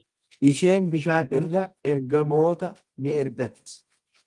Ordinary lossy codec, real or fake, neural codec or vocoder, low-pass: Opus, 24 kbps; fake; codec, 24 kHz, 0.9 kbps, WavTokenizer, medium music audio release; 10.8 kHz